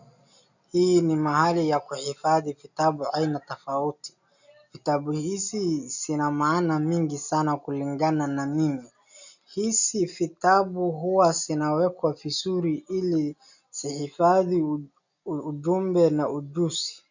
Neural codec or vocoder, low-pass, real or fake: none; 7.2 kHz; real